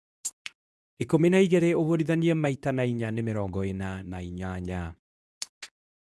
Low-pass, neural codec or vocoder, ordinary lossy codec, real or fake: none; codec, 24 kHz, 0.9 kbps, WavTokenizer, medium speech release version 2; none; fake